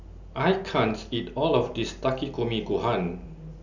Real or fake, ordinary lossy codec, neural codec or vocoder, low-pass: real; none; none; 7.2 kHz